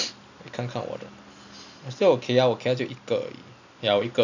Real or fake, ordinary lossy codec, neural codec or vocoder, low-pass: real; none; none; 7.2 kHz